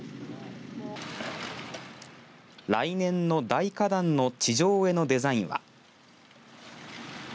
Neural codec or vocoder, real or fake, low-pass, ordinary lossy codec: none; real; none; none